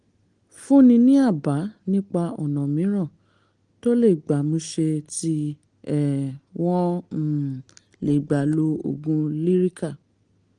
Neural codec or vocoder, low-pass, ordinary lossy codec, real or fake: none; 9.9 kHz; Opus, 24 kbps; real